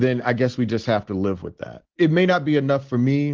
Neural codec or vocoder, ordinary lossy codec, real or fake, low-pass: none; Opus, 16 kbps; real; 7.2 kHz